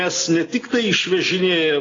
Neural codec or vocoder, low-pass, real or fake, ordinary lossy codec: none; 7.2 kHz; real; AAC, 32 kbps